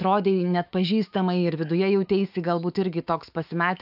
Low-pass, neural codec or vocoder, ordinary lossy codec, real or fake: 5.4 kHz; autoencoder, 48 kHz, 128 numbers a frame, DAC-VAE, trained on Japanese speech; AAC, 48 kbps; fake